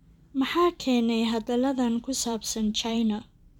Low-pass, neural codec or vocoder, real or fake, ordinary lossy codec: 19.8 kHz; vocoder, 44.1 kHz, 128 mel bands every 512 samples, BigVGAN v2; fake; none